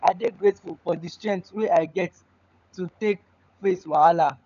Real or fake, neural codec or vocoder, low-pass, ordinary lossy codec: fake; codec, 16 kHz, 16 kbps, FunCodec, trained on LibriTTS, 50 frames a second; 7.2 kHz; none